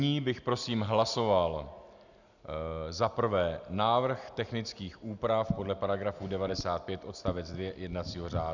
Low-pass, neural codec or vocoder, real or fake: 7.2 kHz; none; real